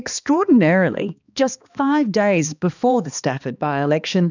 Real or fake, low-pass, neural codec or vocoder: fake; 7.2 kHz; codec, 16 kHz, 2 kbps, X-Codec, HuBERT features, trained on balanced general audio